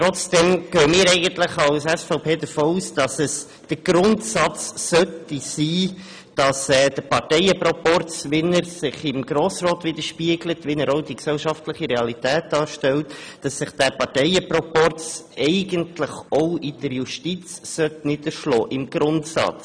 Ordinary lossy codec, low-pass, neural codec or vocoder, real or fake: none; 9.9 kHz; none; real